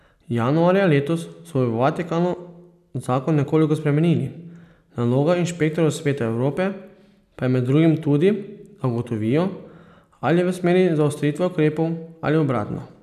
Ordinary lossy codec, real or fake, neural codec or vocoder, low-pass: none; real; none; 14.4 kHz